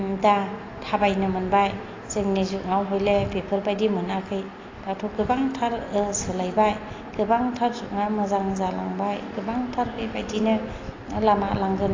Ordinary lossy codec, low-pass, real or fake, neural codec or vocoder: AAC, 32 kbps; 7.2 kHz; real; none